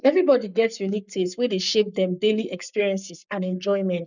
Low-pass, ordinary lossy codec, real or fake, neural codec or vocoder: 7.2 kHz; none; fake; codec, 44.1 kHz, 7.8 kbps, Pupu-Codec